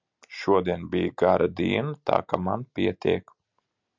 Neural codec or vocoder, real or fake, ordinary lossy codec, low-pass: none; real; MP3, 64 kbps; 7.2 kHz